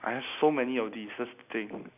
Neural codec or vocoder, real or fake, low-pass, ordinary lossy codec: none; real; 3.6 kHz; none